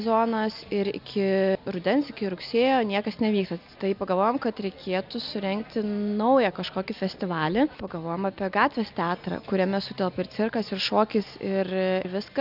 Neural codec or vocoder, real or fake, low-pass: none; real; 5.4 kHz